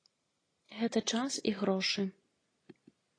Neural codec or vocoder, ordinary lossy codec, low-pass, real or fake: none; AAC, 32 kbps; 9.9 kHz; real